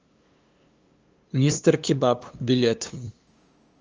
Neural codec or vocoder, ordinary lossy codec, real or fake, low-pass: codec, 16 kHz, 2 kbps, FunCodec, trained on LibriTTS, 25 frames a second; Opus, 24 kbps; fake; 7.2 kHz